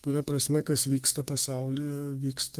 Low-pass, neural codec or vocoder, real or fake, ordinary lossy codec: 14.4 kHz; codec, 32 kHz, 1.9 kbps, SNAC; fake; Opus, 24 kbps